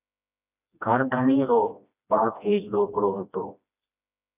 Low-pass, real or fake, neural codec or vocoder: 3.6 kHz; fake; codec, 16 kHz, 1 kbps, FreqCodec, smaller model